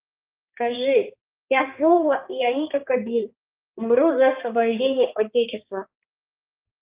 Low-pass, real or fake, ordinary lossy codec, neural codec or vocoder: 3.6 kHz; fake; Opus, 24 kbps; codec, 16 kHz, 2 kbps, X-Codec, HuBERT features, trained on balanced general audio